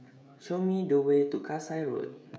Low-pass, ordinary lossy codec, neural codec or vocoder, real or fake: none; none; codec, 16 kHz, 16 kbps, FreqCodec, smaller model; fake